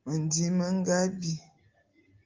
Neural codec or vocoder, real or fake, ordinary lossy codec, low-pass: none; real; Opus, 32 kbps; 7.2 kHz